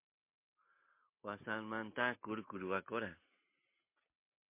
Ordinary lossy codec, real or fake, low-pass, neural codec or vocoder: MP3, 24 kbps; real; 3.6 kHz; none